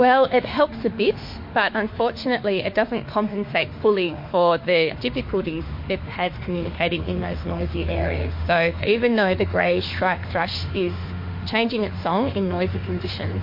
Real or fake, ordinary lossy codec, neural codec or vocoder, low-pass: fake; MP3, 32 kbps; autoencoder, 48 kHz, 32 numbers a frame, DAC-VAE, trained on Japanese speech; 5.4 kHz